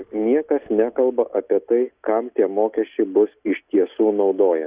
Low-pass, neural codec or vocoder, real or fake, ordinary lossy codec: 3.6 kHz; none; real; Opus, 64 kbps